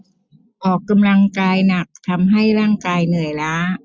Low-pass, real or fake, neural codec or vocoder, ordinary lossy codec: none; real; none; none